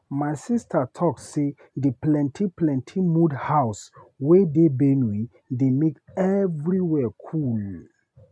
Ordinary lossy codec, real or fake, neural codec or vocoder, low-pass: none; real; none; none